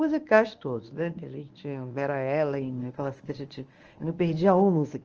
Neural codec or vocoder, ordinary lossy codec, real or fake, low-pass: codec, 24 kHz, 0.9 kbps, WavTokenizer, medium speech release version 1; Opus, 24 kbps; fake; 7.2 kHz